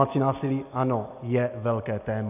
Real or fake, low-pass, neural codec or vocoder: real; 3.6 kHz; none